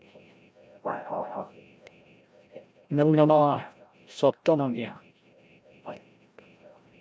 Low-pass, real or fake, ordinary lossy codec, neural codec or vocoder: none; fake; none; codec, 16 kHz, 0.5 kbps, FreqCodec, larger model